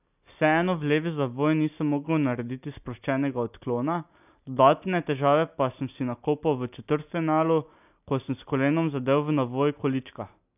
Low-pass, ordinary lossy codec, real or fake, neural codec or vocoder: 3.6 kHz; none; real; none